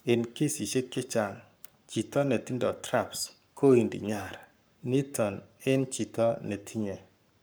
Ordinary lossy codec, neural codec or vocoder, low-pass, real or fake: none; codec, 44.1 kHz, 7.8 kbps, Pupu-Codec; none; fake